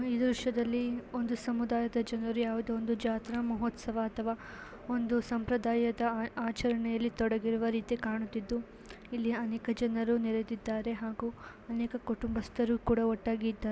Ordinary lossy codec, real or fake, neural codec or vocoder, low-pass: none; real; none; none